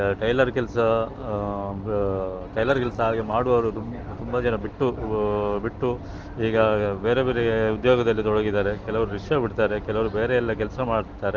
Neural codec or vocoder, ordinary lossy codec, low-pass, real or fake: none; Opus, 16 kbps; 7.2 kHz; real